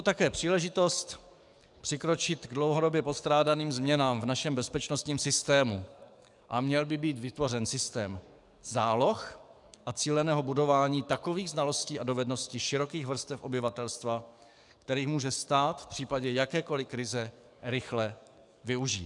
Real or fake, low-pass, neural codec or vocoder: fake; 10.8 kHz; codec, 44.1 kHz, 7.8 kbps, DAC